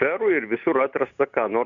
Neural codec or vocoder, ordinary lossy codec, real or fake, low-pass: none; Opus, 64 kbps; real; 7.2 kHz